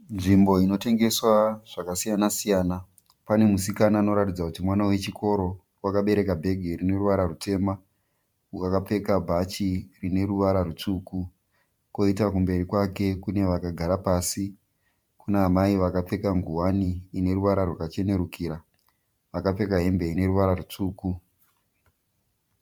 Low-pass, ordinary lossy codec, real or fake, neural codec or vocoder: 19.8 kHz; MP3, 96 kbps; fake; vocoder, 44.1 kHz, 128 mel bands every 512 samples, BigVGAN v2